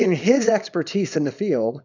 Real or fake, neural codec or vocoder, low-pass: fake; codec, 24 kHz, 0.9 kbps, WavTokenizer, small release; 7.2 kHz